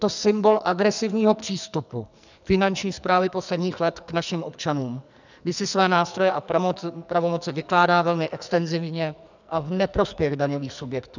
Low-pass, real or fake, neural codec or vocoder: 7.2 kHz; fake; codec, 44.1 kHz, 2.6 kbps, SNAC